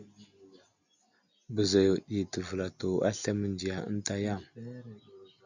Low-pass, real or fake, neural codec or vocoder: 7.2 kHz; real; none